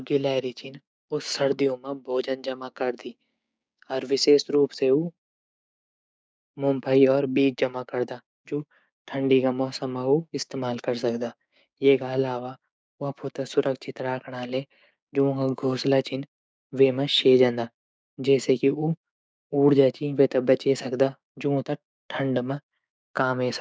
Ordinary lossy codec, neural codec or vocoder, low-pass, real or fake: none; codec, 16 kHz, 6 kbps, DAC; none; fake